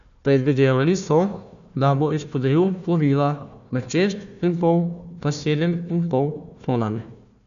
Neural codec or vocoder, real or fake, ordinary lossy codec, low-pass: codec, 16 kHz, 1 kbps, FunCodec, trained on Chinese and English, 50 frames a second; fake; none; 7.2 kHz